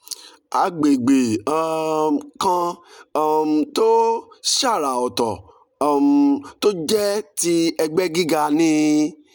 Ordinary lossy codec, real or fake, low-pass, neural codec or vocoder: none; real; 19.8 kHz; none